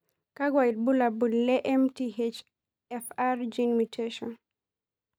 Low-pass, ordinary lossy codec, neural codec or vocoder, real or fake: 19.8 kHz; none; none; real